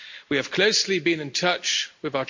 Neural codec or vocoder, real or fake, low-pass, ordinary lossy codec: none; real; 7.2 kHz; MP3, 48 kbps